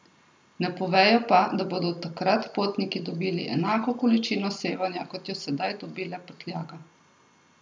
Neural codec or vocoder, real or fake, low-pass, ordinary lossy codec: none; real; none; none